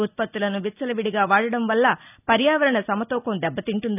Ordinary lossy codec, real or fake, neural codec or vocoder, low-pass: none; real; none; 3.6 kHz